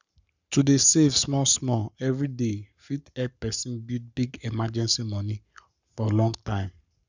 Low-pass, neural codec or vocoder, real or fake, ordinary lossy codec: 7.2 kHz; codec, 44.1 kHz, 7.8 kbps, Pupu-Codec; fake; none